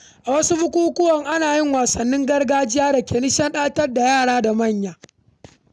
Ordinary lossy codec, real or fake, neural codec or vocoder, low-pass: none; real; none; none